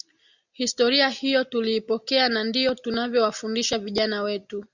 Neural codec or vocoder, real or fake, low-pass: none; real; 7.2 kHz